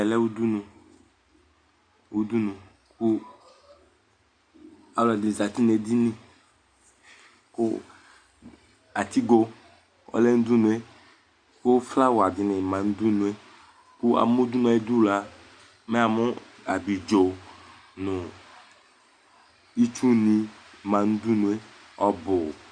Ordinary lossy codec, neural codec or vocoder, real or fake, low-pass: Opus, 64 kbps; none; real; 9.9 kHz